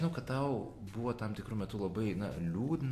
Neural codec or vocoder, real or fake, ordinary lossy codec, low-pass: none; real; AAC, 64 kbps; 14.4 kHz